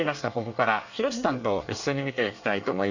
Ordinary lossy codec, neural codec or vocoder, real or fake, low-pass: none; codec, 24 kHz, 1 kbps, SNAC; fake; 7.2 kHz